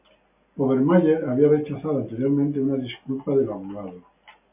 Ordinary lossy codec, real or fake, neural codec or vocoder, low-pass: MP3, 32 kbps; real; none; 3.6 kHz